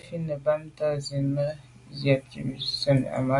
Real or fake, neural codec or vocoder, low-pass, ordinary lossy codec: real; none; 10.8 kHz; AAC, 64 kbps